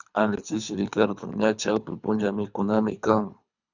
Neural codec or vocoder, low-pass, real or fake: codec, 24 kHz, 3 kbps, HILCodec; 7.2 kHz; fake